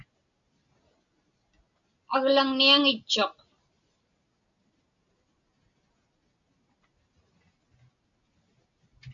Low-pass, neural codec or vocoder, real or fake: 7.2 kHz; none; real